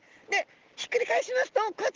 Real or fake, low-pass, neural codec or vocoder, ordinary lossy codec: real; 7.2 kHz; none; Opus, 16 kbps